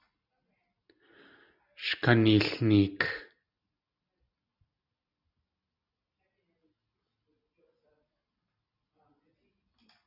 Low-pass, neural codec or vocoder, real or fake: 5.4 kHz; none; real